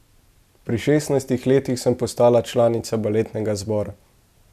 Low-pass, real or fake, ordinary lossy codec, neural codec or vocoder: 14.4 kHz; real; none; none